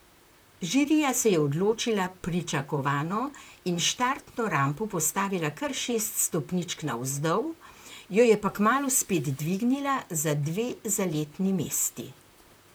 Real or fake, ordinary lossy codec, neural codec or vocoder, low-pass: fake; none; vocoder, 44.1 kHz, 128 mel bands, Pupu-Vocoder; none